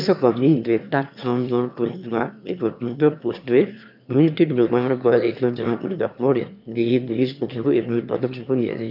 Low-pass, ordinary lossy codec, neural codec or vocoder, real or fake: 5.4 kHz; none; autoencoder, 22.05 kHz, a latent of 192 numbers a frame, VITS, trained on one speaker; fake